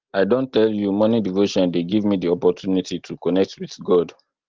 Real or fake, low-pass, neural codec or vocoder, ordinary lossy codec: real; 7.2 kHz; none; Opus, 16 kbps